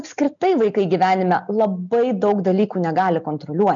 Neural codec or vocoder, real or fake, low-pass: none; real; 7.2 kHz